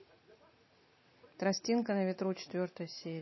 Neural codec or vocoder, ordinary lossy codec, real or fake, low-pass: none; MP3, 24 kbps; real; 7.2 kHz